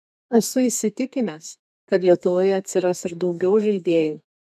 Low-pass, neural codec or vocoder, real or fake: 14.4 kHz; codec, 32 kHz, 1.9 kbps, SNAC; fake